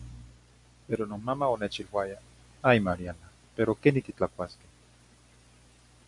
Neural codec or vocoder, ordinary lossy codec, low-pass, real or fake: none; MP3, 64 kbps; 10.8 kHz; real